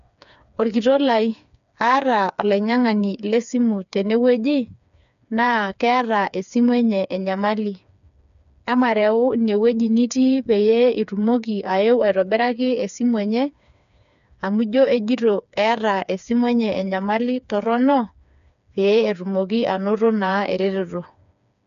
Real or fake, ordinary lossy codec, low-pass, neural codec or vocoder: fake; none; 7.2 kHz; codec, 16 kHz, 4 kbps, FreqCodec, smaller model